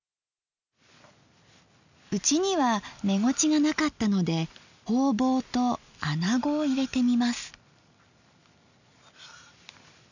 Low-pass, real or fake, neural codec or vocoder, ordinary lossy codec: 7.2 kHz; real; none; none